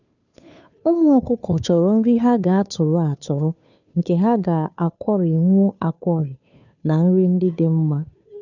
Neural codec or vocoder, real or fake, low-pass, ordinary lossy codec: codec, 16 kHz, 2 kbps, FunCodec, trained on Chinese and English, 25 frames a second; fake; 7.2 kHz; none